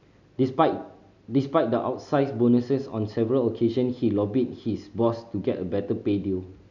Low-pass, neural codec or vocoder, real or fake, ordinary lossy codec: 7.2 kHz; none; real; none